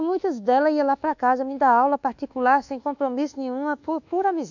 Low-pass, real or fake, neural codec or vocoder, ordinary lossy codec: 7.2 kHz; fake; codec, 24 kHz, 1.2 kbps, DualCodec; none